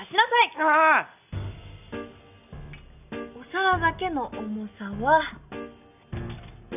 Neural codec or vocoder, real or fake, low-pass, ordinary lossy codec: none; real; 3.6 kHz; none